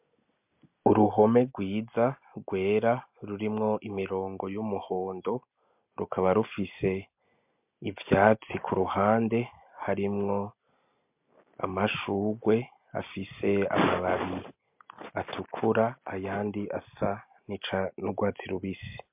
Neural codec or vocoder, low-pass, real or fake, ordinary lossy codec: none; 3.6 kHz; real; MP3, 32 kbps